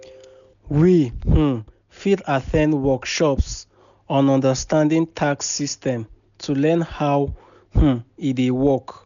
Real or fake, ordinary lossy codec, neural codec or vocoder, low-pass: real; none; none; 7.2 kHz